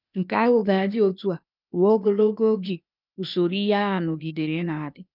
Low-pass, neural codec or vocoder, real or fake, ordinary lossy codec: 5.4 kHz; codec, 16 kHz, 0.8 kbps, ZipCodec; fake; none